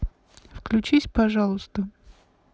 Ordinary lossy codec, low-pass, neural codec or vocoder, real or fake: none; none; none; real